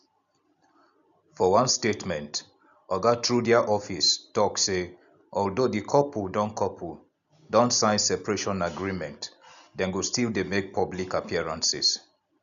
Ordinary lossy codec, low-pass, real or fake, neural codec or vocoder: MP3, 96 kbps; 7.2 kHz; real; none